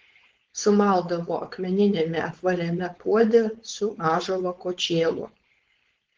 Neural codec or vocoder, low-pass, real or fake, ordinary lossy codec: codec, 16 kHz, 4.8 kbps, FACodec; 7.2 kHz; fake; Opus, 16 kbps